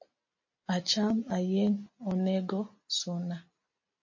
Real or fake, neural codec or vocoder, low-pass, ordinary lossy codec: real; none; 7.2 kHz; MP3, 32 kbps